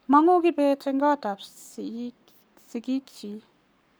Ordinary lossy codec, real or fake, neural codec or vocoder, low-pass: none; fake; codec, 44.1 kHz, 7.8 kbps, Pupu-Codec; none